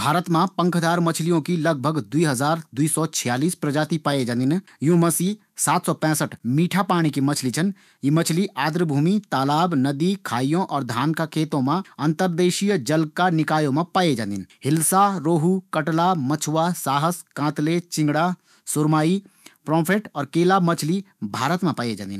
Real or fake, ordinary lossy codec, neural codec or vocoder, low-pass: fake; none; autoencoder, 48 kHz, 128 numbers a frame, DAC-VAE, trained on Japanese speech; 14.4 kHz